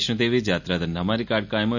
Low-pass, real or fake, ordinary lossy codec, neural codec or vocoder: 7.2 kHz; real; none; none